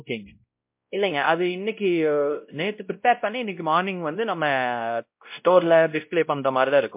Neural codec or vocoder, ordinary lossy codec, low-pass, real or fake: codec, 16 kHz, 0.5 kbps, X-Codec, WavLM features, trained on Multilingual LibriSpeech; MP3, 32 kbps; 3.6 kHz; fake